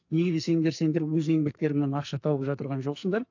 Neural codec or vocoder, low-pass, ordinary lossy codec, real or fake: codec, 44.1 kHz, 2.6 kbps, SNAC; 7.2 kHz; AAC, 48 kbps; fake